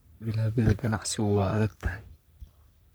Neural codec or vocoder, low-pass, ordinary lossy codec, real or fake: codec, 44.1 kHz, 3.4 kbps, Pupu-Codec; none; none; fake